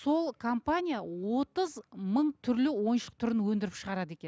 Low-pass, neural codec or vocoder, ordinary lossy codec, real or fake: none; none; none; real